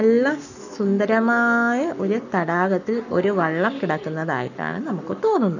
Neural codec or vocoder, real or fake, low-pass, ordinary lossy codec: codec, 44.1 kHz, 7.8 kbps, Pupu-Codec; fake; 7.2 kHz; none